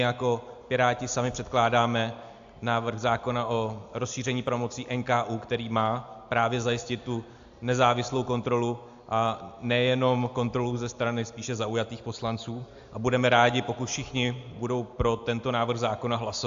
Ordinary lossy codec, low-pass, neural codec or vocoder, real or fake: AAC, 64 kbps; 7.2 kHz; none; real